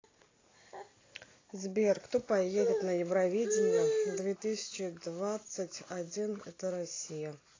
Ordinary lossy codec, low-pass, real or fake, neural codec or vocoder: AAC, 32 kbps; 7.2 kHz; real; none